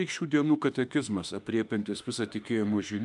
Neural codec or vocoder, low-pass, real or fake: autoencoder, 48 kHz, 32 numbers a frame, DAC-VAE, trained on Japanese speech; 10.8 kHz; fake